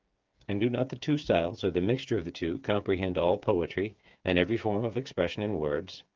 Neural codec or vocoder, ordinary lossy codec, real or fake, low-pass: codec, 16 kHz, 8 kbps, FreqCodec, smaller model; Opus, 24 kbps; fake; 7.2 kHz